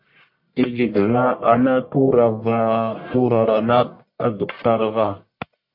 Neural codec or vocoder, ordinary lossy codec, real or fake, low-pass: codec, 44.1 kHz, 1.7 kbps, Pupu-Codec; MP3, 32 kbps; fake; 5.4 kHz